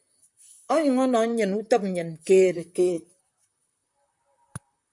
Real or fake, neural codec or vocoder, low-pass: fake; vocoder, 44.1 kHz, 128 mel bands, Pupu-Vocoder; 10.8 kHz